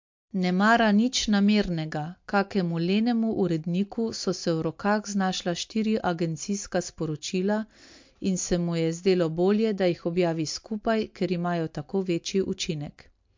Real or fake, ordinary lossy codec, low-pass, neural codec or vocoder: real; MP3, 48 kbps; 7.2 kHz; none